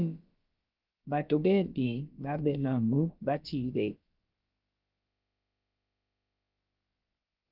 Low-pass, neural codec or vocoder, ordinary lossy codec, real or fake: 5.4 kHz; codec, 16 kHz, about 1 kbps, DyCAST, with the encoder's durations; Opus, 24 kbps; fake